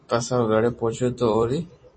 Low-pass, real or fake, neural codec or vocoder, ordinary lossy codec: 9.9 kHz; fake; vocoder, 44.1 kHz, 128 mel bands every 256 samples, BigVGAN v2; MP3, 32 kbps